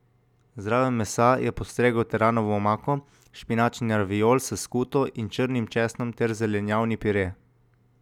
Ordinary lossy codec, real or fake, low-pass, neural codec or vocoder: none; real; 19.8 kHz; none